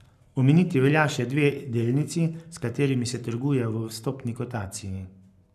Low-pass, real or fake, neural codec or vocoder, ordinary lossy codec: 14.4 kHz; fake; codec, 44.1 kHz, 7.8 kbps, Pupu-Codec; none